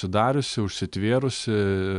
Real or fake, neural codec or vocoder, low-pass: real; none; 10.8 kHz